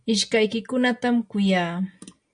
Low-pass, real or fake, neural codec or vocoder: 9.9 kHz; real; none